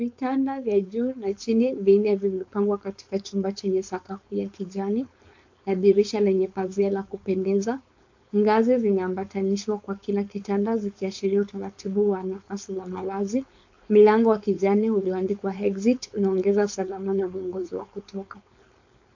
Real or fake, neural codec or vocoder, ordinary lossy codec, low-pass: fake; codec, 16 kHz, 4.8 kbps, FACodec; AAC, 48 kbps; 7.2 kHz